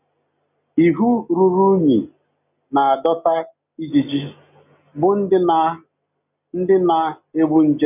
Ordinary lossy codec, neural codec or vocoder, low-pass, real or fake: none; none; 3.6 kHz; real